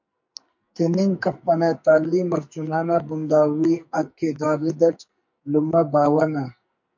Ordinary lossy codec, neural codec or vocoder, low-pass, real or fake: MP3, 48 kbps; codec, 44.1 kHz, 2.6 kbps, SNAC; 7.2 kHz; fake